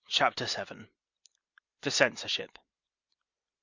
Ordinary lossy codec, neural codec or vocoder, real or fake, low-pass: Opus, 64 kbps; none; real; 7.2 kHz